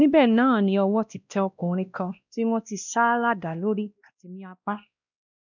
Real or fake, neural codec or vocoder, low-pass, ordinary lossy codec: fake; codec, 16 kHz, 1 kbps, X-Codec, WavLM features, trained on Multilingual LibriSpeech; 7.2 kHz; none